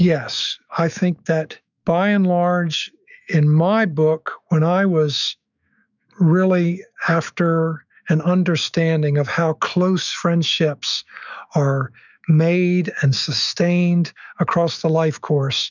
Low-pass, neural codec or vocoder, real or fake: 7.2 kHz; autoencoder, 48 kHz, 128 numbers a frame, DAC-VAE, trained on Japanese speech; fake